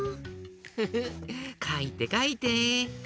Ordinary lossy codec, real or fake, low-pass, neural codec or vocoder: none; real; none; none